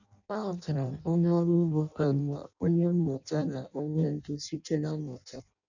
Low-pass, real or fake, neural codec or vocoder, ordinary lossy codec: 7.2 kHz; fake; codec, 16 kHz in and 24 kHz out, 0.6 kbps, FireRedTTS-2 codec; none